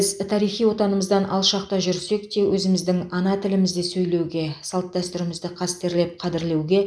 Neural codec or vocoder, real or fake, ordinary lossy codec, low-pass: none; real; none; none